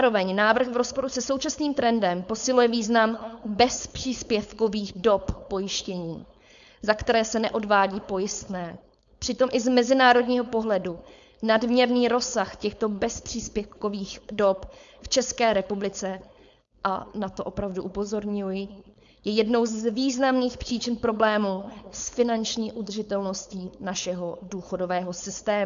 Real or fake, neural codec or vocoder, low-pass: fake; codec, 16 kHz, 4.8 kbps, FACodec; 7.2 kHz